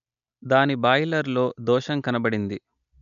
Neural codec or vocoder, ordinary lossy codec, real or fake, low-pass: none; MP3, 96 kbps; real; 7.2 kHz